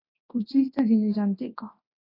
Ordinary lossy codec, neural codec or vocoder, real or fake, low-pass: AAC, 24 kbps; codec, 24 kHz, 0.9 kbps, WavTokenizer, large speech release; fake; 5.4 kHz